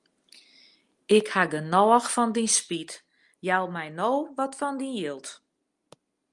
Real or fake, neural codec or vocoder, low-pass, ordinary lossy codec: real; none; 10.8 kHz; Opus, 32 kbps